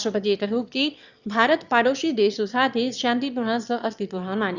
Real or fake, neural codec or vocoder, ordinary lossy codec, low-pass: fake; autoencoder, 22.05 kHz, a latent of 192 numbers a frame, VITS, trained on one speaker; Opus, 64 kbps; 7.2 kHz